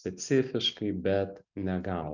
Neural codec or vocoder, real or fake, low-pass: none; real; 7.2 kHz